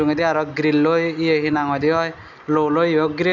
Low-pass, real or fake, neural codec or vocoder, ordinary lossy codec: 7.2 kHz; real; none; none